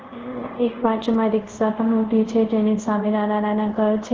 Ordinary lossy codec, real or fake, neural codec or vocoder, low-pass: Opus, 16 kbps; fake; codec, 24 kHz, 0.5 kbps, DualCodec; 7.2 kHz